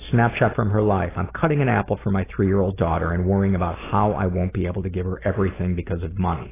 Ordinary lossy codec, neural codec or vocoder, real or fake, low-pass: AAC, 16 kbps; none; real; 3.6 kHz